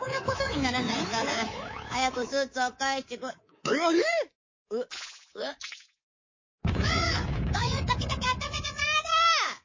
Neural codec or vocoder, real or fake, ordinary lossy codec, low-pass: codec, 24 kHz, 3.1 kbps, DualCodec; fake; MP3, 32 kbps; 7.2 kHz